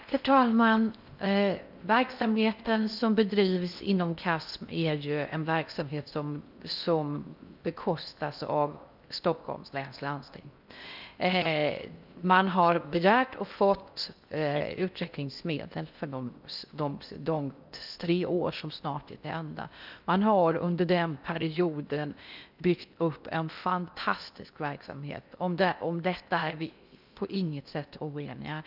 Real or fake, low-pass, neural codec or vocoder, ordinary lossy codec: fake; 5.4 kHz; codec, 16 kHz in and 24 kHz out, 0.6 kbps, FocalCodec, streaming, 4096 codes; none